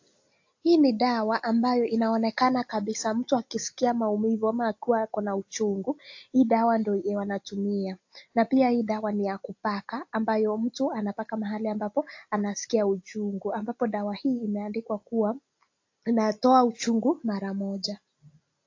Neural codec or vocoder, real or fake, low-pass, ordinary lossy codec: none; real; 7.2 kHz; AAC, 48 kbps